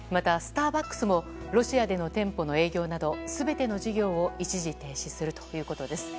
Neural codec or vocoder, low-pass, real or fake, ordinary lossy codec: none; none; real; none